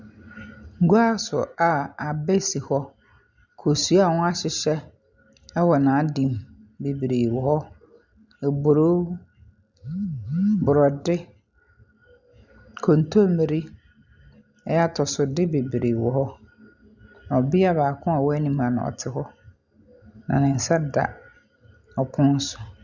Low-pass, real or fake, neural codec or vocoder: 7.2 kHz; real; none